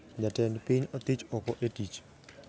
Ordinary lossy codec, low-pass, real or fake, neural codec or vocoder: none; none; real; none